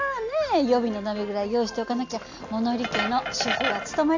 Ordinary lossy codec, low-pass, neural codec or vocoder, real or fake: AAC, 48 kbps; 7.2 kHz; none; real